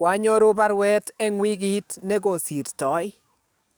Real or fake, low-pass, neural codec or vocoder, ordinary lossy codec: fake; none; codec, 44.1 kHz, 7.8 kbps, DAC; none